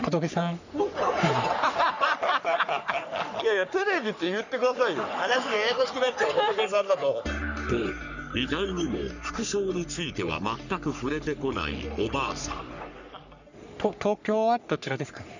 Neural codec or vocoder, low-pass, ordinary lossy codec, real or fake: codec, 44.1 kHz, 3.4 kbps, Pupu-Codec; 7.2 kHz; none; fake